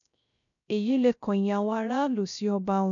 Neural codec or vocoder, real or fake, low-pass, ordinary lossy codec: codec, 16 kHz, 0.3 kbps, FocalCodec; fake; 7.2 kHz; none